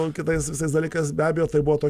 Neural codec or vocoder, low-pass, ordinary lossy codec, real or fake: none; 14.4 kHz; Opus, 64 kbps; real